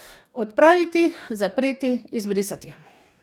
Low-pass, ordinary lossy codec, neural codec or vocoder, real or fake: 19.8 kHz; none; codec, 44.1 kHz, 2.6 kbps, DAC; fake